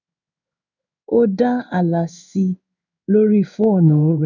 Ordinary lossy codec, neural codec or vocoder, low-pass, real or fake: none; codec, 16 kHz in and 24 kHz out, 1 kbps, XY-Tokenizer; 7.2 kHz; fake